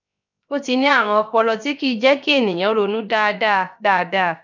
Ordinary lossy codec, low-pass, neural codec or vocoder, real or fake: none; 7.2 kHz; codec, 16 kHz, 0.7 kbps, FocalCodec; fake